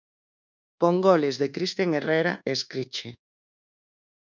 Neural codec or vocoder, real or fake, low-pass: codec, 24 kHz, 1.2 kbps, DualCodec; fake; 7.2 kHz